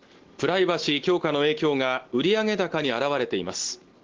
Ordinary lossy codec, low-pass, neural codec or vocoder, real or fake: Opus, 16 kbps; 7.2 kHz; none; real